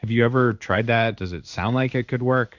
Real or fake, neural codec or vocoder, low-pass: fake; codec, 16 kHz in and 24 kHz out, 1 kbps, XY-Tokenizer; 7.2 kHz